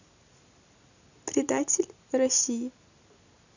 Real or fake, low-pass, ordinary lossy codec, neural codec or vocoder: real; 7.2 kHz; none; none